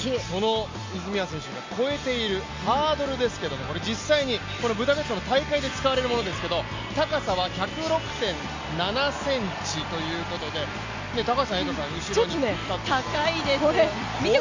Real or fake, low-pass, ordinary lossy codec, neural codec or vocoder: real; 7.2 kHz; MP3, 48 kbps; none